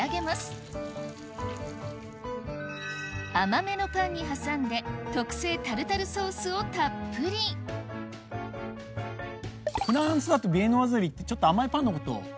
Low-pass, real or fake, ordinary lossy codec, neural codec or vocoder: none; real; none; none